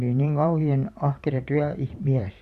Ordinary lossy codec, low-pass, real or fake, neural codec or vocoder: Opus, 64 kbps; 14.4 kHz; fake; vocoder, 44.1 kHz, 128 mel bands every 512 samples, BigVGAN v2